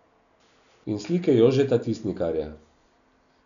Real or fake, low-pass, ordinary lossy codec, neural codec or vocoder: real; 7.2 kHz; none; none